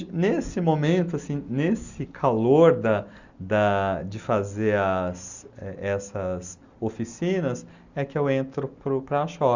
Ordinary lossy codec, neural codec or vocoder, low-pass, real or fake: Opus, 64 kbps; none; 7.2 kHz; real